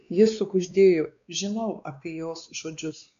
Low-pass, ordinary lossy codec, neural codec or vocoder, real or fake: 7.2 kHz; MP3, 64 kbps; codec, 16 kHz, 2 kbps, X-Codec, WavLM features, trained on Multilingual LibriSpeech; fake